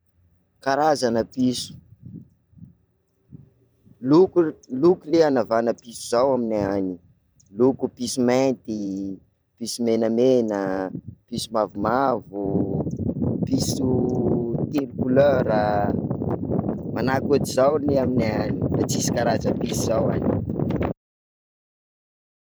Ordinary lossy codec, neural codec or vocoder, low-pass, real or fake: none; vocoder, 44.1 kHz, 128 mel bands every 512 samples, BigVGAN v2; none; fake